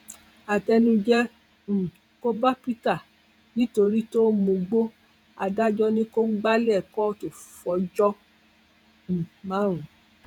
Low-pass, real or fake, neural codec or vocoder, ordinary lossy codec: 19.8 kHz; fake; vocoder, 48 kHz, 128 mel bands, Vocos; none